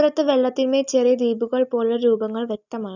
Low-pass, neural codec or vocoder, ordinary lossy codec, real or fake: 7.2 kHz; none; none; real